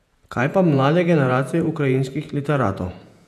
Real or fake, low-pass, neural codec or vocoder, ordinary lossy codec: fake; 14.4 kHz; vocoder, 48 kHz, 128 mel bands, Vocos; none